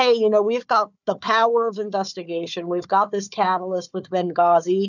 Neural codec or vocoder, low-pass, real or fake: codec, 16 kHz, 4 kbps, FunCodec, trained on Chinese and English, 50 frames a second; 7.2 kHz; fake